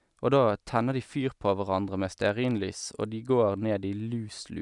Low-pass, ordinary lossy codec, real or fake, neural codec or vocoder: 10.8 kHz; none; real; none